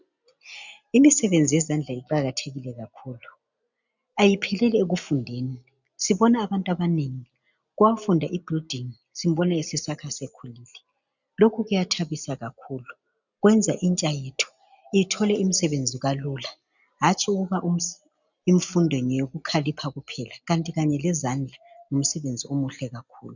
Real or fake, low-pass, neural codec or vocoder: real; 7.2 kHz; none